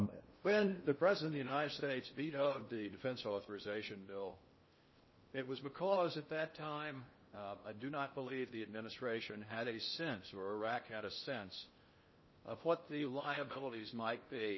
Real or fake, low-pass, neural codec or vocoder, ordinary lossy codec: fake; 7.2 kHz; codec, 16 kHz in and 24 kHz out, 0.6 kbps, FocalCodec, streaming, 4096 codes; MP3, 24 kbps